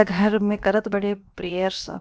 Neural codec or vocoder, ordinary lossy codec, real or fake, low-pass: codec, 16 kHz, 0.7 kbps, FocalCodec; none; fake; none